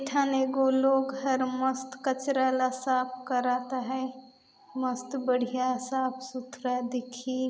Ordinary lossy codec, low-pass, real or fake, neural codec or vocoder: none; none; real; none